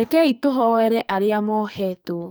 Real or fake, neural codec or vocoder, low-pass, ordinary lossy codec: fake; codec, 44.1 kHz, 2.6 kbps, SNAC; none; none